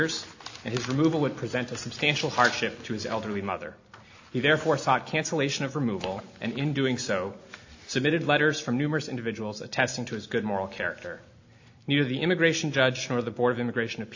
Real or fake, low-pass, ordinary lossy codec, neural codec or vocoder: real; 7.2 kHz; AAC, 48 kbps; none